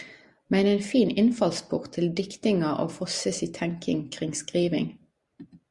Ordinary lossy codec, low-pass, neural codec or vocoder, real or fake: Opus, 64 kbps; 10.8 kHz; none; real